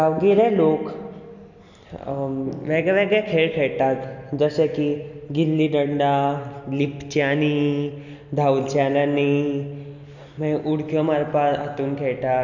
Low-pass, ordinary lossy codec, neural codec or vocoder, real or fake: 7.2 kHz; none; none; real